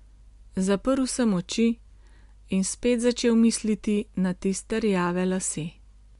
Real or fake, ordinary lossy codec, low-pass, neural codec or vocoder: real; MP3, 64 kbps; 10.8 kHz; none